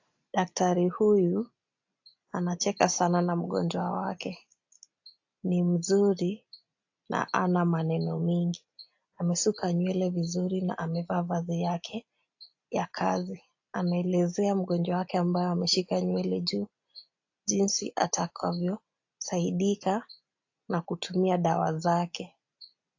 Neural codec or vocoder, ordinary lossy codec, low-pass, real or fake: none; AAC, 48 kbps; 7.2 kHz; real